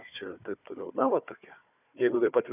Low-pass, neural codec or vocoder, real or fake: 3.6 kHz; codec, 16 kHz, 4 kbps, FunCodec, trained on Chinese and English, 50 frames a second; fake